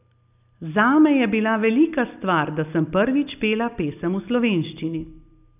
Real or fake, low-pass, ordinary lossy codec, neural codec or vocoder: real; 3.6 kHz; none; none